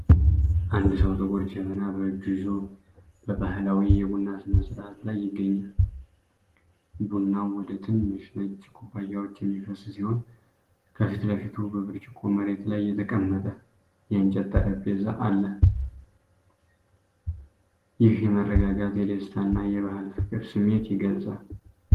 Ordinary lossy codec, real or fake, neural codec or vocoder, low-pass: Opus, 16 kbps; real; none; 14.4 kHz